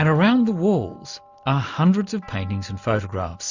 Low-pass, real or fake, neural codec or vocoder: 7.2 kHz; real; none